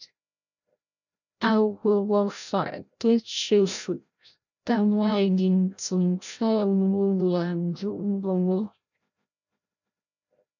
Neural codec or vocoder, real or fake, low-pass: codec, 16 kHz, 0.5 kbps, FreqCodec, larger model; fake; 7.2 kHz